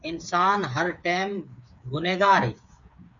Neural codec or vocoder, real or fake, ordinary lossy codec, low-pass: codec, 16 kHz, 8 kbps, FreqCodec, smaller model; fake; MP3, 96 kbps; 7.2 kHz